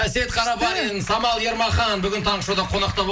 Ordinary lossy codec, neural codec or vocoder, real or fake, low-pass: none; none; real; none